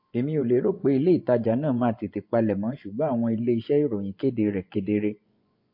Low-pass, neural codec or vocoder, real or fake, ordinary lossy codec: 5.4 kHz; vocoder, 24 kHz, 100 mel bands, Vocos; fake; MP3, 32 kbps